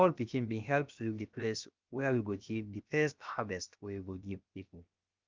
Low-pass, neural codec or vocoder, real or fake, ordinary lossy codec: 7.2 kHz; codec, 16 kHz, about 1 kbps, DyCAST, with the encoder's durations; fake; Opus, 24 kbps